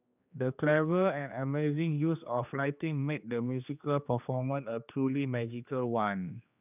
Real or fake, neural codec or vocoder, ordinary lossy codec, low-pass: fake; codec, 16 kHz, 2 kbps, X-Codec, HuBERT features, trained on general audio; none; 3.6 kHz